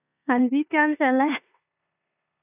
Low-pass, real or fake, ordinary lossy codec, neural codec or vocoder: 3.6 kHz; fake; none; codec, 16 kHz in and 24 kHz out, 0.9 kbps, LongCat-Audio-Codec, four codebook decoder